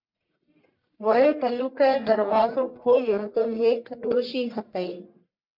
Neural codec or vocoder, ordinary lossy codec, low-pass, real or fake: codec, 44.1 kHz, 1.7 kbps, Pupu-Codec; MP3, 48 kbps; 5.4 kHz; fake